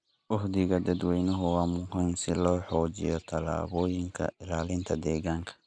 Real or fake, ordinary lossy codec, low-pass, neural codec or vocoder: real; none; 9.9 kHz; none